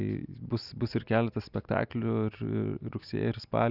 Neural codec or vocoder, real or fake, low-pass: none; real; 5.4 kHz